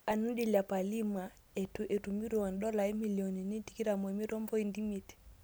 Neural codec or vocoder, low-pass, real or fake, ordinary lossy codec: none; none; real; none